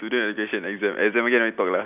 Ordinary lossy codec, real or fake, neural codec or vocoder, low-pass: none; real; none; 3.6 kHz